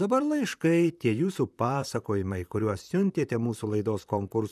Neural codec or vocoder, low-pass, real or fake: vocoder, 44.1 kHz, 128 mel bands, Pupu-Vocoder; 14.4 kHz; fake